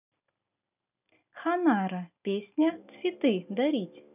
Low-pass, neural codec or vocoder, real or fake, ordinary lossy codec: 3.6 kHz; none; real; none